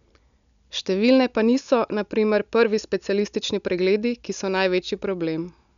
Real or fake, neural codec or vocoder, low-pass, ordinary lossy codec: real; none; 7.2 kHz; none